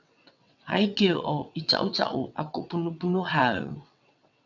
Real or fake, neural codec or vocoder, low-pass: fake; vocoder, 22.05 kHz, 80 mel bands, WaveNeXt; 7.2 kHz